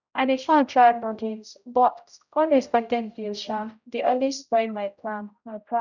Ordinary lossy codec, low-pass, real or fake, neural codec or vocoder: none; 7.2 kHz; fake; codec, 16 kHz, 0.5 kbps, X-Codec, HuBERT features, trained on general audio